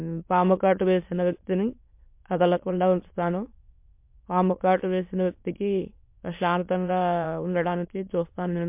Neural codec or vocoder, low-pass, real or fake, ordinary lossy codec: autoencoder, 22.05 kHz, a latent of 192 numbers a frame, VITS, trained on many speakers; 3.6 kHz; fake; MP3, 32 kbps